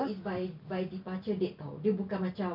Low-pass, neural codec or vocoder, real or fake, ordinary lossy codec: 5.4 kHz; none; real; none